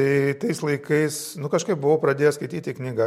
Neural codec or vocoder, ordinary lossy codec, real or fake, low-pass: none; MP3, 64 kbps; real; 19.8 kHz